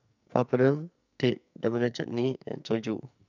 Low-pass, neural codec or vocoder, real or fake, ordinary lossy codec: 7.2 kHz; codec, 44.1 kHz, 2.6 kbps, SNAC; fake; none